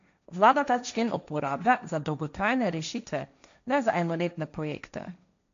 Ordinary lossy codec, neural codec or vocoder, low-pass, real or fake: MP3, 64 kbps; codec, 16 kHz, 1.1 kbps, Voila-Tokenizer; 7.2 kHz; fake